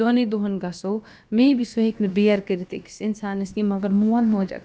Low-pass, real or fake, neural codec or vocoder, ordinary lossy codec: none; fake; codec, 16 kHz, about 1 kbps, DyCAST, with the encoder's durations; none